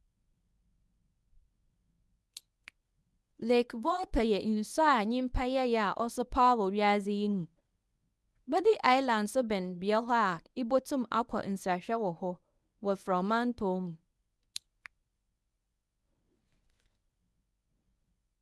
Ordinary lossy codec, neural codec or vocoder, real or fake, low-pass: none; codec, 24 kHz, 0.9 kbps, WavTokenizer, medium speech release version 1; fake; none